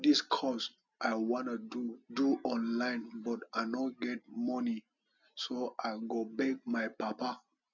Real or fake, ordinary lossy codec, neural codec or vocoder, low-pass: real; none; none; 7.2 kHz